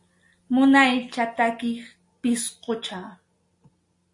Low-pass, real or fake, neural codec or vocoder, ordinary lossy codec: 10.8 kHz; real; none; MP3, 48 kbps